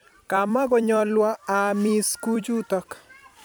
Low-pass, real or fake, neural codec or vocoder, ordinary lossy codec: none; fake; vocoder, 44.1 kHz, 128 mel bands every 256 samples, BigVGAN v2; none